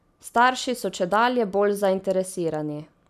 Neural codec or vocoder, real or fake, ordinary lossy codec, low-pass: none; real; none; 14.4 kHz